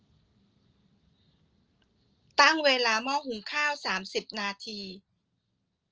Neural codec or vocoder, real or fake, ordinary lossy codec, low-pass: none; real; Opus, 24 kbps; 7.2 kHz